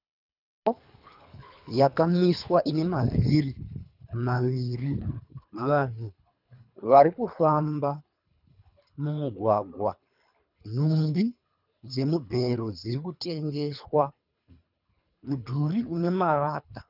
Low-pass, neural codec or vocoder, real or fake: 5.4 kHz; codec, 24 kHz, 3 kbps, HILCodec; fake